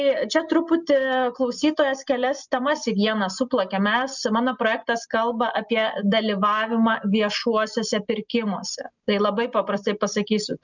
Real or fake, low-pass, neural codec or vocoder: real; 7.2 kHz; none